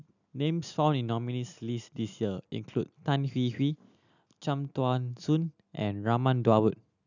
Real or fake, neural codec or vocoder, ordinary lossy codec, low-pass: real; none; none; 7.2 kHz